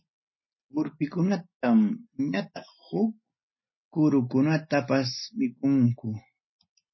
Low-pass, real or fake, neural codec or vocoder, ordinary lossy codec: 7.2 kHz; real; none; MP3, 24 kbps